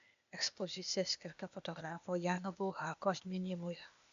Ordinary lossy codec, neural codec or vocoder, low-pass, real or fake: AAC, 64 kbps; codec, 16 kHz, 0.8 kbps, ZipCodec; 7.2 kHz; fake